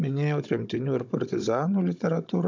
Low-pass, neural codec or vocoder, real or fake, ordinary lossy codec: 7.2 kHz; codec, 16 kHz, 16 kbps, FunCodec, trained on Chinese and English, 50 frames a second; fake; MP3, 64 kbps